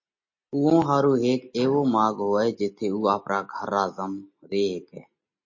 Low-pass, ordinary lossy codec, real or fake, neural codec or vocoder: 7.2 kHz; MP3, 32 kbps; real; none